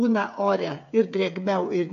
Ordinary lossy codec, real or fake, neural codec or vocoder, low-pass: MP3, 96 kbps; fake; codec, 16 kHz, 8 kbps, FreqCodec, smaller model; 7.2 kHz